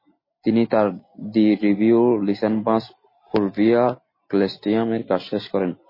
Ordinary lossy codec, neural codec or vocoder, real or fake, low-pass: MP3, 24 kbps; none; real; 5.4 kHz